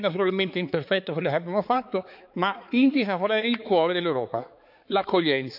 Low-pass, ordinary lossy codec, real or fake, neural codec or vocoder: 5.4 kHz; none; fake; codec, 16 kHz, 4 kbps, X-Codec, HuBERT features, trained on balanced general audio